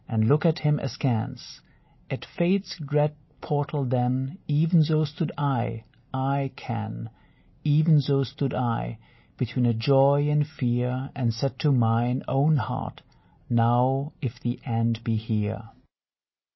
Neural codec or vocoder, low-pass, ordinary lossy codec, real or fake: none; 7.2 kHz; MP3, 24 kbps; real